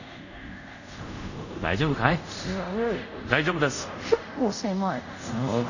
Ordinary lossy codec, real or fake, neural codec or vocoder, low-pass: none; fake; codec, 24 kHz, 0.5 kbps, DualCodec; 7.2 kHz